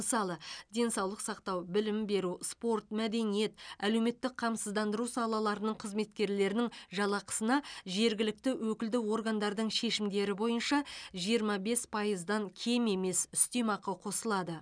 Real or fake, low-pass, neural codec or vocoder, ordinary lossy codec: real; 9.9 kHz; none; none